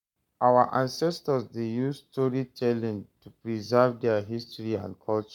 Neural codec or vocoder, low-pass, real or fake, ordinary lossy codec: codec, 44.1 kHz, 7.8 kbps, Pupu-Codec; 19.8 kHz; fake; none